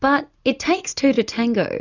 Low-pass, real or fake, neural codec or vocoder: 7.2 kHz; real; none